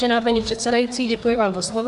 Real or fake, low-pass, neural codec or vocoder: fake; 10.8 kHz; codec, 24 kHz, 1 kbps, SNAC